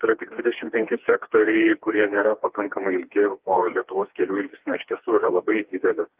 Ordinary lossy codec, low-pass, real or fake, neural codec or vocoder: Opus, 32 kbps; 3.6 kHz; fake; codec, 16 kHz, 2 kbps, FreqCodec, smaller model